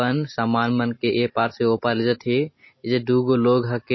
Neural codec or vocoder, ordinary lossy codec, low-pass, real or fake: none; MP3, 24 kbps; 7.2 kHz; real